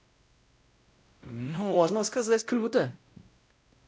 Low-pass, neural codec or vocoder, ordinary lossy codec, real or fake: none; codec, 16 kHz, 0.5 kbps, X-Codec, WavLM features, trained on Multilingual LibriSpeech; none; fake